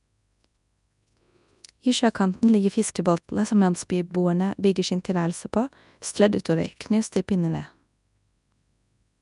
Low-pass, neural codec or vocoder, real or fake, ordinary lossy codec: 10.8 kHz; codec, 24 kHz, 0.9 kbps, WavTokenizer, large speech release; fake; none